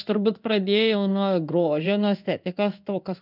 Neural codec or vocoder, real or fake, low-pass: codec, 16 kHz in and 24 kHz out, 1 kbps, XY-Tokenizer; fake; 5.4 kHz